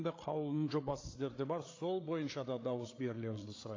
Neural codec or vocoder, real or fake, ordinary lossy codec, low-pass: codec, 16 kHz, 8 kbps, FreqCodec, larger model; fake; AAC, 32 kbps; 7.2 kHz